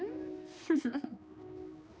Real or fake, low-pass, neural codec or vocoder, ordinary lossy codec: fake; none; codec, 16 kHz, 2 kbps, X-Codec, HuBERT features, trained on balanced general audio; none